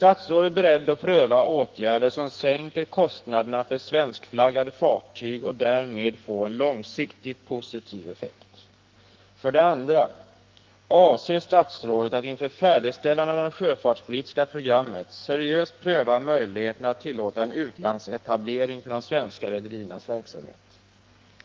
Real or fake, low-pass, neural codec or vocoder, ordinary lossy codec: fake; 7.2 kHz; codec, 44.1 kHz, 2.6 kbps, SNAC; Opus, 32 kbps